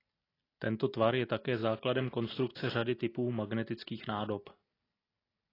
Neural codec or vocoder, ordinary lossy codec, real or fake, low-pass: none; AAC, 24 kbps; real; 5.4 kHz